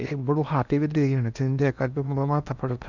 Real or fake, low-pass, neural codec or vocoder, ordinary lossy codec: fake; 7.2 kHz; codec, 16 kHz in and 24 kHz out, 0.8 kbps, FocalCodec, streaming, 65536 codes; none